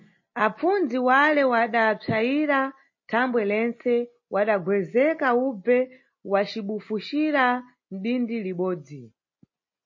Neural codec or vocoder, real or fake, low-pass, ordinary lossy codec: none; real; 7.2 kHz; MP3, 32 kbps